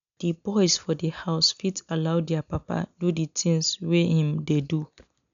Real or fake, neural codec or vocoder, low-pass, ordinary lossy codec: real; none; 7.2 kHz; none